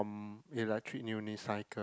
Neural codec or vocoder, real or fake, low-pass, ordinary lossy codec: none; real; none; none